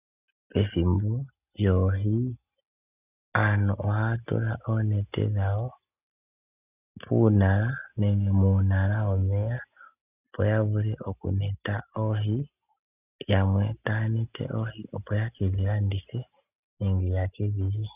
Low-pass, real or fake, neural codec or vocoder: 3.6 kHz; real; none